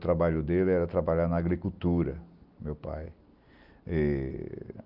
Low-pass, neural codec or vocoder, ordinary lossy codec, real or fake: 5.4 kHz; none; Opus, 24 kbps; real